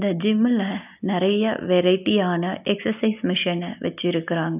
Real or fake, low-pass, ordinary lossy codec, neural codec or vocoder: real; 3.6 kHz; none; none